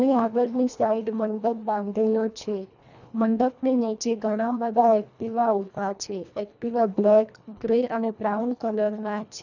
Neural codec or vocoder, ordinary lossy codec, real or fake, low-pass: codec, 24 kHz, 1.5 kbps, HILCodec; none; fake; 7.2 kHz